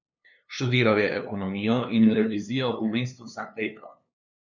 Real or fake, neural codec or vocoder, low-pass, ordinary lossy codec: fake; codec, 16 kHz, 2 kbps, FunCodec, trained on LibriTTS, 25 frames a second; 7.2 kHz; none